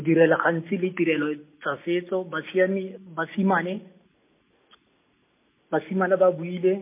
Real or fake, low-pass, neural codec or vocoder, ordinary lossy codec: real; 3.6 kHz; none; MP3, 24 kbps